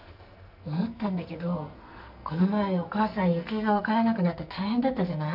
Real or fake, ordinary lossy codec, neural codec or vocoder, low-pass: fake; none; codec, 44.1 kHz, 2.6 kbps, SNAC; 5.4 kHz